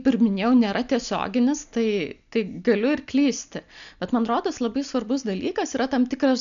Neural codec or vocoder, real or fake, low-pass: none; real; 7.2 kHz